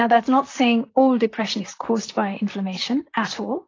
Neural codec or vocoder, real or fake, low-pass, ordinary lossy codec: vocoder, 44.1 kHz, 128 mel bands, Pupu-Vocoder; fake; 7.2 kHz; AAC, 32 kbps